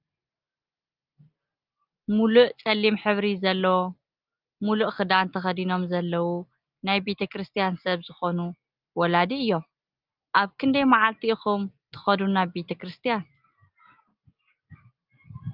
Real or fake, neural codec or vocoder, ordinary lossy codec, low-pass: real; none; Opus, 24 kbps; 5.4 kHz